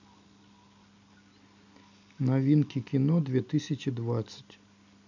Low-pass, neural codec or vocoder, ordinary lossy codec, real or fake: 7.2 kHz; none; none; real